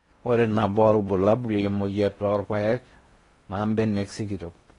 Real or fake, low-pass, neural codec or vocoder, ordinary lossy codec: fake; 10.8 kHz; codec, 16 kHz in and 24 kHz out, 0.6 kbps, FocalCodec, streaming, 4096 codes; AAC, 32 kbps